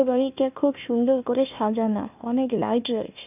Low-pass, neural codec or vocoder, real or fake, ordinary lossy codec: 3.6 kHz; codec, 16 kHz, 1 kbps, FunCodec, trained on Chinese and English, 50 frames a second; fake; none